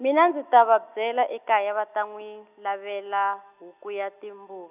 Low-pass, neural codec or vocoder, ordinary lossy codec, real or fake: 3.6 kHz; none; none; real